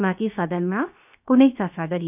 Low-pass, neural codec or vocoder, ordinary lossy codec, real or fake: 3.6 kHz; codec, 16 kHz, 0.7 kbps, FocalCodec; none; fake